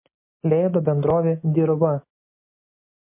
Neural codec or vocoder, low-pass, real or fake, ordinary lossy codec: none; 3.6 kHz; real; MP3, 24 kbps